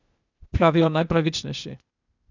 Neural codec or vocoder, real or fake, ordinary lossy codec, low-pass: codec, 16 kHz, 0.8 kbps, ZipCodec; fake; none; 7.2 kHz